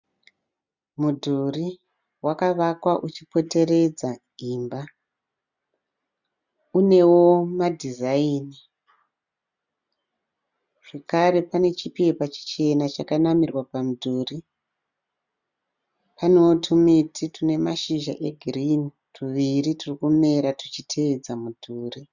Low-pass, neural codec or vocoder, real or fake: 7.2 kHz; none; real